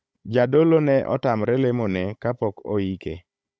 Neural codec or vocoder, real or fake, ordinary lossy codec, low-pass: codec, 16 kHz, 16 kbps, FunCodec, trained on Chinese and English, 50 frames a second; fake; none; none